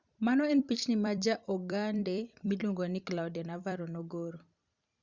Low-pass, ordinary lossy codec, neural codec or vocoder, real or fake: 7.2 kHz; Opus, 64 kbps; vocoder, 44.1 kHz, 128 mel bands every 256 samples, BigVGAN v2; fake